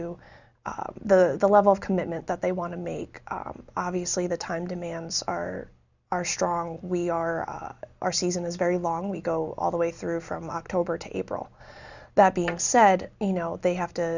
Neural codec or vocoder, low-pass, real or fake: none; 7.2 kHz; real